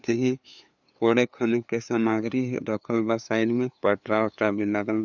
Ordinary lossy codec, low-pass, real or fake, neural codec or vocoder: none; 7.2 kHz; fake; codec, 16 kHz, 2 kbps, FunCodec, trained on LibriTTS, 25 frames a second